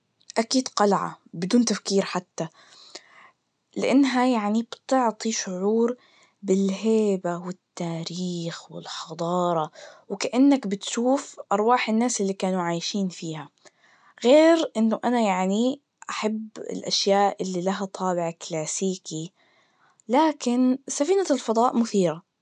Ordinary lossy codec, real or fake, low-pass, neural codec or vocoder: none; real; 9.9 kHz; none